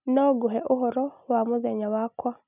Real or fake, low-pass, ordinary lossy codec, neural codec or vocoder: real; 3.6 kHz; none; none